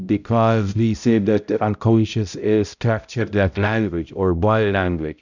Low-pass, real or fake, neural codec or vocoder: 7.2 kHz; fake; codec, 16 kHz, 0.5 kbps, X-Codec, HuBERT features, trained on balanced general audio